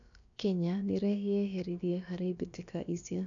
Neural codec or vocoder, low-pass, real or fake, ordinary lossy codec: codec, 16 kHz, about 1 kbps, DyCAST, with the encoder's durations; 7.2 kHz; fake; none